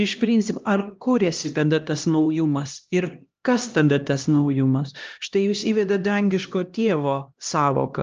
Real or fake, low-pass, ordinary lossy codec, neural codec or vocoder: fake; 7.2 kHz; Opus, 24 kbps; codec, 16 kHz, 1 kbps, X-Codec, HuBERT features, trained on LibriSpeech